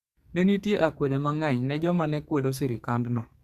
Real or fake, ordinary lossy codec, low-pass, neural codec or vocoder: fake; Opus, 64 kbps; 14.4 kHz; codec, 44.1 kHz, 2.6 kbps, SNAC